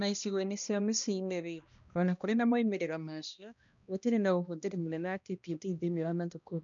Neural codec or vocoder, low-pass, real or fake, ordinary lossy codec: codec, 16 kHz, 1 kbps, X-Codec, HuBERT features, trained on balanced general audio; 7.2 kHz; fake; none